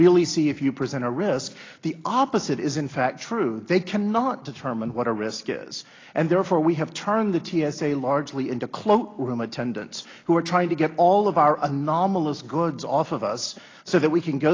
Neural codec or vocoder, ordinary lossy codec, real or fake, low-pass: none; AAC, 32 kbps; real; 7.2 kHz